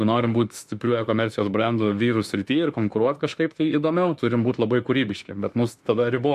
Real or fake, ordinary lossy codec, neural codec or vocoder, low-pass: fake; MP3, 64 kbps; autoencoder, 48 kHz, 32 numbers a frame, DAC-VAE, trained on Japanese speech; 14.4 kHz